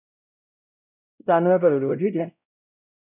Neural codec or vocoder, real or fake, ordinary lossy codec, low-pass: codec, 16 kHz, 0.5 kbps, X-Codec, WavLM features, trained on Multilingual LibriSpeech; fake; none; 3.6 kHz